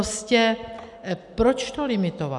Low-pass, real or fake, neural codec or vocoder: 10.8 kHz; real; none